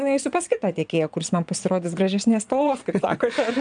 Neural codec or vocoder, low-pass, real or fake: vocoder, 22.05 kHz, 80 mel bands, Vocos; 9.9 kHz; fake